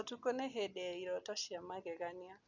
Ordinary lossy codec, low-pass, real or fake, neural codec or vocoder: none; 7.2 kHz; real; none